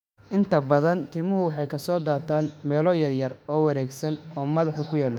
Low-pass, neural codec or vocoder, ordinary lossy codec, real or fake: 19.8 kHz; autoencoder, 48 kHz, 32 numbers a frame, DAC-VAE, trained on Japanese speech; none; fake